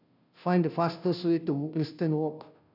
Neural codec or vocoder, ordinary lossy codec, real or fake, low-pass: codec, 16 kHz, 0.5 kbps, FunCodec, trained on Chinese and English, 25 frames a second; none; fake; 5.4 kHz